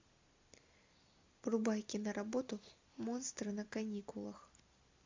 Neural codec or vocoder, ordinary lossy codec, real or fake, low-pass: none; MP3, 64 kbps; real; 7.2 kHz